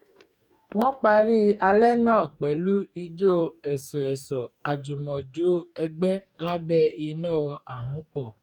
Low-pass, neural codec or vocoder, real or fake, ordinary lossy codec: 19.8 kHz; codec, 44.1 kHz, 2.6 kbps, DAC; fake; none